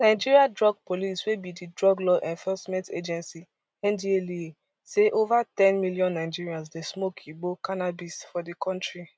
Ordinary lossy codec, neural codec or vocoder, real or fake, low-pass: none; none; real; none